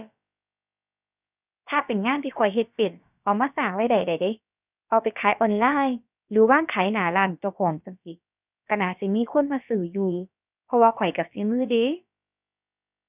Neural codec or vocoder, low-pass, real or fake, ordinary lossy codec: codec, 16 kHz, about 1 kbps, DyCAST, with the encoder's durations; 3.6 kHz; fake; none